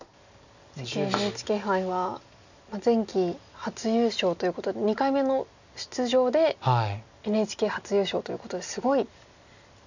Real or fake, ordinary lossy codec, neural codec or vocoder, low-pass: real; none; none; 7.2 kHz